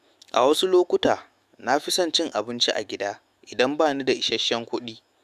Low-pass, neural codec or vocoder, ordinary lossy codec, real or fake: 14.4 kHz; autoencoder, 48 kHz, 128 numbers a frame, DAC-VAE, trained on Japanese speech; Opus, 64 kbps; fake